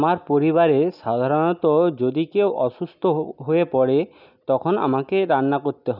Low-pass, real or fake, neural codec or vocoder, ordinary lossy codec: 5.4 kHz; real; none; none